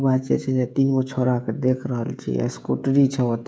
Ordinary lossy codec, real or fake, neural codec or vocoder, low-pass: none; fake; codec, 16 kHz, 16 kbps, FreqCodec, smaller model; none